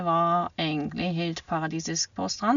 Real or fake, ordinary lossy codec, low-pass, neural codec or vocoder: real; none; 7.2 kHz; none